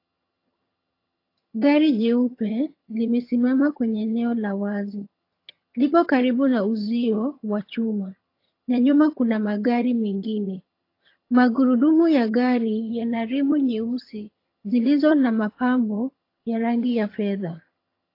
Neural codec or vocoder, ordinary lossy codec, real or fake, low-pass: vocoder, 22.05 kHz, 80 mel bands, HiFi-GAN; AAC, 32 kbps; fake; 5.4 kHz